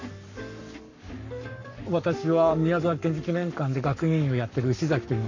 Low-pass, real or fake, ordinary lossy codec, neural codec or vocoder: 7.2 kHz; fake; none; codec, 44.1 kHz, 7.8 kbps, Pupu-Codec